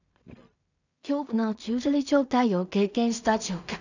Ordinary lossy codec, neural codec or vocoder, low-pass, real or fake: none; codec, 16 kHz in and 24 kHz out, 0.4 kbps, LongCat-Audio-Codec, two codebook decoder; 7.2 kHz; fake